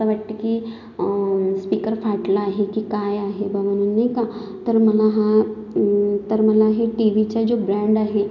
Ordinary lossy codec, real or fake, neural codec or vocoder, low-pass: none; real; none; 7.2 kHz